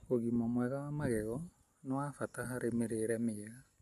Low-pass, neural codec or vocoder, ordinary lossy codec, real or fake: 14.4 kHz; none; MP3, 64 kbps; real